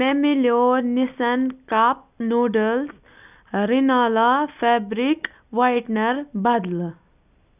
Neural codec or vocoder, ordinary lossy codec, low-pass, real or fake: none; none; 3.6 kHz; real